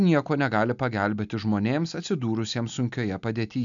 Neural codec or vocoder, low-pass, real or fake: none; 7.2 kHz; real